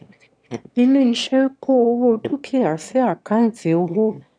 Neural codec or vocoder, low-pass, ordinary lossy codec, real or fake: autoencoder, 22.05 kHz, a latent of 192 numbers a frame, VITS, trained on one speaker; 9.9 kHz; none; fake